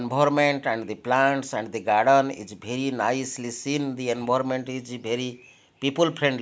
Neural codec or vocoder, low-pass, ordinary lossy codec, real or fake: none; none; none; real